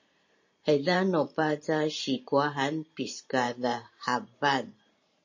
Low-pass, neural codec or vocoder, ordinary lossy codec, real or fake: 7.2 kHz; vocoder, 44.1 kHz, 128 mel bands every 512 samples, BigVGAN v2; MP3, 32 kbps; fake